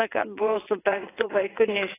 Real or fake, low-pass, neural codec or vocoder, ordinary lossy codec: fake; 3.6 kHz; vocoder, 22.05 kHz, 80 mel bands, WaveNeXt; AAC, 16 kbps